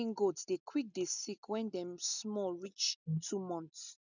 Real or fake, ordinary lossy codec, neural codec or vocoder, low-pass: real; none; none; 7.2 kHz